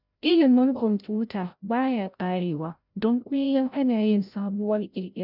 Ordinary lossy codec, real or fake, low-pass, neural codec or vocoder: none; fake; 5.4 kHz; codec, 16 kHz, 0.5 kbps, FreqCodec, larger model